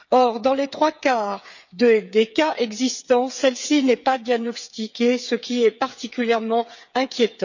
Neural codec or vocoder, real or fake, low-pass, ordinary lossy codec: codec, 16 kHz, 8 kbps, FreqCodec, smaller model; fake; 7.2 kHz; none